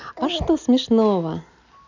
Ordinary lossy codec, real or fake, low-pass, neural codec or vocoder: none; real; 7.2 kHz; none